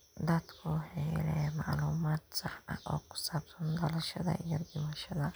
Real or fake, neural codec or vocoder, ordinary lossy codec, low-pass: real; none; none; none